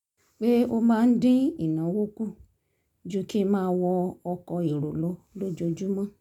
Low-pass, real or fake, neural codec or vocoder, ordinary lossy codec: 19.8 kHz; real; none; none